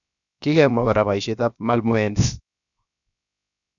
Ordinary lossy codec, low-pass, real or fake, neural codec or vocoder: none; 7.2 kHz; fake; codec, 16 kHz, 0.7 kbps, FocalCodec